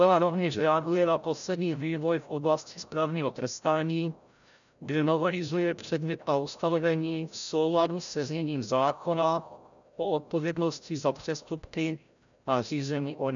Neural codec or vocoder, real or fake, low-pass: codec, 16 kHz, 0.5 kbps, FreqCodec, larger model; fake; 7.2 kHz